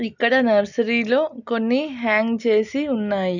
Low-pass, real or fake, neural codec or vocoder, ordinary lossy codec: 7.2 kHz; real; none; none